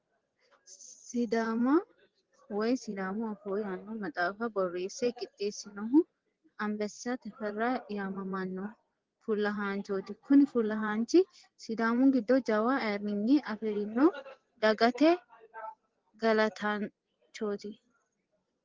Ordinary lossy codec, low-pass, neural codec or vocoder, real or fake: Opus, 16 kbps; 7.2 kHz; vocoder, 24 kHz, 100 mel bands, Vocos; fake